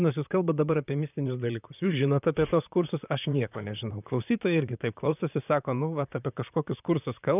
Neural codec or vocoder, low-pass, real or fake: vocoder, 44.1 kHz, 128 mel bands, Pupu-Vocoder; 3.6 kHz; fake